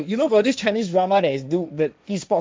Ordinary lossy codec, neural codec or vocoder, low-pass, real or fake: none; codec, 16 kHz, 1.1 kbps, Voila-Tokenizer; none; fake